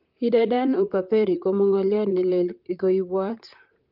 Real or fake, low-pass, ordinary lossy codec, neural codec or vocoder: fake; 5.4 kHz; Opus, 24 kbps; vocoder, 44.1 kHz, 128 mel bands, Pupu-Vocoder